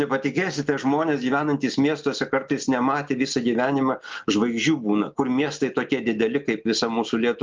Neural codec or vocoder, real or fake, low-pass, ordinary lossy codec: none; real; 7.2 kHz; Opus, 24 kbps